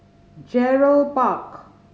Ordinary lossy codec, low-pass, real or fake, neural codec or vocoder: none; none; real; none